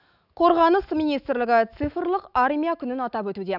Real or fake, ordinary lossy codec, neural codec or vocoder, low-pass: fake; none; autoencoder, 48 kHz, 128 numbers a frame, DAC-VAE, trained on Japanese speech; 5.4 kHz